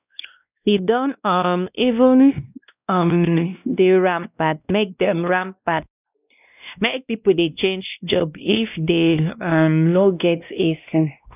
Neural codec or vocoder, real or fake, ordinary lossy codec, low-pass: codec, 16 kHz, 1 kbps, X-Codec, WavLM features, trained on Multilingual LibriSpeech; fake; none; 3.6 kHz